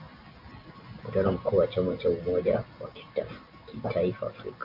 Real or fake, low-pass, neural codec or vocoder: real; 5.4 kHz; none